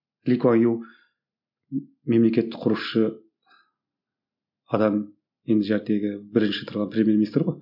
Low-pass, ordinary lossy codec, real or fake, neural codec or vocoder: 5.4 kHz; none; real; none